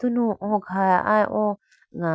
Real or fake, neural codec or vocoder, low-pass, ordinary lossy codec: real; none; none; none